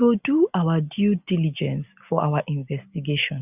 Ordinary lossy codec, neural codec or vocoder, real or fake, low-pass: none; none; real; 3.6 kHz